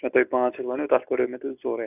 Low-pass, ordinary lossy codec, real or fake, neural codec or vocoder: 3.6 kHz; none; real; none